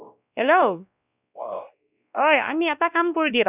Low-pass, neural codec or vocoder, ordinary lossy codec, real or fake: 3.6 kHz; codec, 16 kHz, 1 kbps, X-Codec, WavLM features, trained on Multilingual LibriSpeech; none; fake